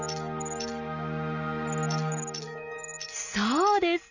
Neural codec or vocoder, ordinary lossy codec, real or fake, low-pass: none; none; real; 7.2 kHz